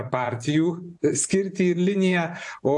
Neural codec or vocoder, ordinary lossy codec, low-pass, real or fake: vocoder, 24 kHz, 100 mel bands, Vocos; AAC, 64 kbps; 10.8 kHz; fake